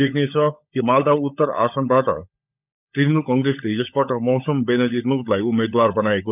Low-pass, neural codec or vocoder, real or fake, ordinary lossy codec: 3.6 kHz; codec, 16 kHz, 16 kbps, FunCodec, trained on LibriTTS, 50 frames a second; fake; none